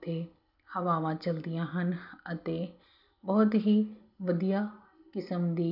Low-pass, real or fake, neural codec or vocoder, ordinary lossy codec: 5.4 kHz; real; none; none